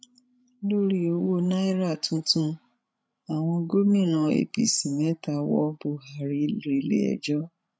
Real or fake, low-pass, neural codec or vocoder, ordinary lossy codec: fake; none; codec, 16 kHz, 16 kbps, FreqCodec, larger model; none